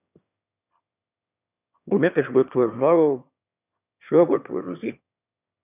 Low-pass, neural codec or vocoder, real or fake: 3.6 kHz; autoencoder, 22.05 kHz, a latent of 192 numbers a frame, VITS, trained on one speaker; fake